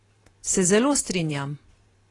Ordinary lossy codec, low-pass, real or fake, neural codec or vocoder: AAC, 32 kbps; 10.8 kHz; fake; vocoder, 44.1 kHz, 128 mel bands every 512 samples, BigVGAN v2